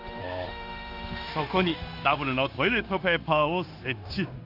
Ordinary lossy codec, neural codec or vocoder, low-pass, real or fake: Opus, 32 kbps; codec, 16 kHz, 0.9 kbps, LongCat-Audio-Codec; 5.4 kHz; fake